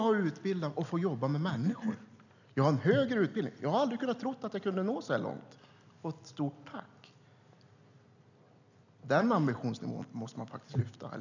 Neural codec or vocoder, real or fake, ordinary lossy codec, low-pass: none; real; none; 7.2 kHz